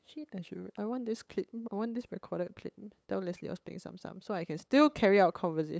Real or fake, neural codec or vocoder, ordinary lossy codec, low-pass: fake; codec, 16 kHz, 8 kbps, FunCodec, trained on LibriTTS, 25 frames a second; none; none